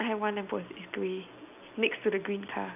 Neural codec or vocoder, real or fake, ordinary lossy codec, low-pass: none; real; none; 3.6 kHz